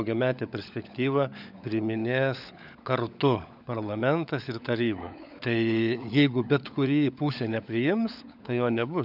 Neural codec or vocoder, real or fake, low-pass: codec, 16 kHz, 16 kbps, FunCodec, trained on LibriTTS, 50 frames a second; fake; 5.4 kHz